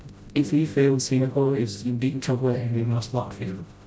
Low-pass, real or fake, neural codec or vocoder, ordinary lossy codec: none; fake; codec, 16 kHz, 0.5 kbps, FreqCodec, smaller model; none